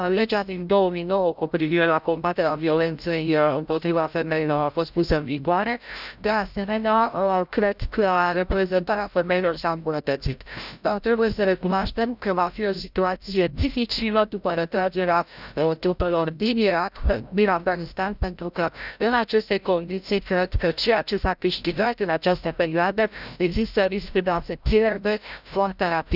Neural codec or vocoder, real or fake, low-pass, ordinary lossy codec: codec, 16 kHz, 0.5 kbps, FreqCodec, larger model; fake; 5.4 kHz; none